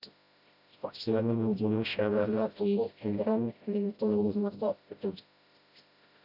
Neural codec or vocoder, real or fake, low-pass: codec, 16 kHz, 0.5 kbps, FreqCodec, smaller model; fake; 5.4 kHz